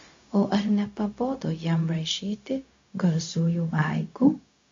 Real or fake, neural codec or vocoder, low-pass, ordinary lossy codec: fake; codec, 16 kHz, 0.4 kbps, LongCat-Audio-Codec; 7.2 kHz; AAC, 48 kbps